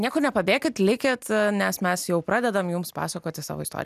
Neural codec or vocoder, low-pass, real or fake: none; 14.4 kHz; real